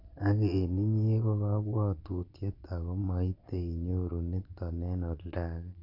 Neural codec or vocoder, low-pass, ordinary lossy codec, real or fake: vocoder, 22.05 kHz, 80 mel bands, Vocos; 5.4 kHz; none; fake